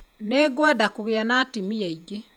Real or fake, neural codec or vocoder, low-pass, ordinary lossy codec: fake; vocoder, 48 kHz, 128 mel bands, Vocos; 19.8 kHz; none